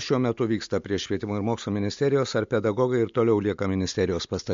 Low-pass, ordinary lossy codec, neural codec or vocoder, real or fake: 7.2 kHz; MP3, 48 kbps; none; real